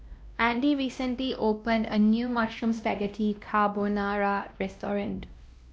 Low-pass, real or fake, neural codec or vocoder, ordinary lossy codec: none; fake; codec, 16 kHz, 1 kbps, X-Codec, WavLM features, trained on Multilingual LibriSpeech; none